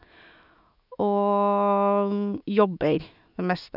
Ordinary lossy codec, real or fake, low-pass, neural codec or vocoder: none; fake; 5.4 kHz; autoencoder, 48 kHz, 128 numbers a frame, DAC-VAE, trained on Japanese speech